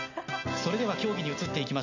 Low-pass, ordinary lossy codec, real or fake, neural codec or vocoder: 7.2 kHz; none; real; none